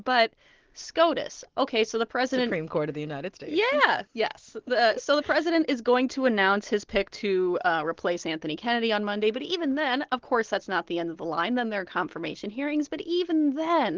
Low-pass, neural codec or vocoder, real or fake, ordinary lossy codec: 7.2 kHz; none; real; Opus, 16 kbps